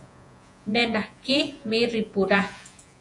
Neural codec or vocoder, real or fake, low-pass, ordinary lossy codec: vocoder, 48 kHz, 128 mel bands, Vocos; fake; 10.8 kHz; AAC, 64 kbps